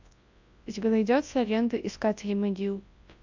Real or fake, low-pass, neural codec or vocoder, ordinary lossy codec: fake; 7.2 kHz; codec, 24 kHz, 0.9 kbps, WavTokenizer, large speech release; AAC, 48 kbps